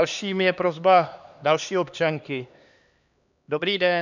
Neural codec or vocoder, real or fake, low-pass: codec, 16 kHz, 2 kbps, X-Codec, HuBERT features, trained on LibriSpeech; fake; 7.2 kHz